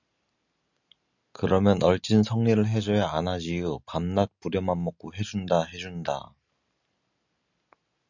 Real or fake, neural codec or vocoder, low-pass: real; none; 7.2 kHz